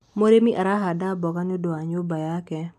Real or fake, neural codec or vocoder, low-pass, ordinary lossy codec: real; none; 14.4 kHz; none